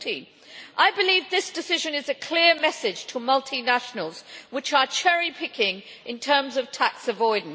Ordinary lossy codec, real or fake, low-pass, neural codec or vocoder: none; real; none; none